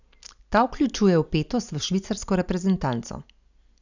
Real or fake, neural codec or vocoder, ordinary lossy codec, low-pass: real; none; none; 7.2 kHz